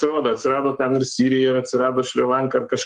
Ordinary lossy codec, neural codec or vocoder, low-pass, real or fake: Opus, 24 kbps; codec, 44.1 kHz, 7.8 kbps, Pupu-Codec; 10.8 kHz; fake